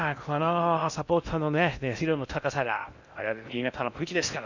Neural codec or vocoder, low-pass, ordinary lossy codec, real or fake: codec, 16 kHz in and 24 kHz out, 0.6 kbps, FocalCodec, streaming, 2048 codes; 7.2 kHz; none; fake